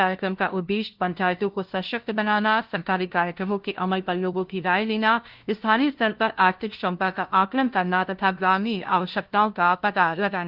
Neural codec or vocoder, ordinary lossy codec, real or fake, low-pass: codec, 16 kHz, 0.5 kbps, FunCodec, trained on LibriTTS, 25 frames a second; Opus, 16 kbps; fake; 5.4 kHz